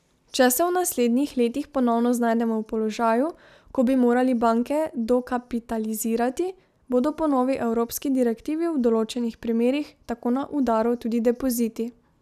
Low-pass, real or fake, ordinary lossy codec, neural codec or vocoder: 14.4 kHz; real; none; none